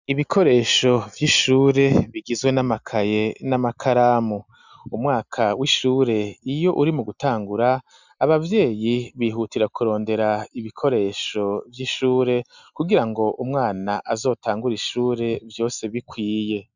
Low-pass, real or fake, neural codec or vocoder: 7.2 kHz; real; none